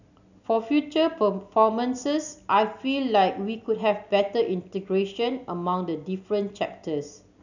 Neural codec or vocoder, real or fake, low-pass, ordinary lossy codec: none; real; 7.2 kHz; none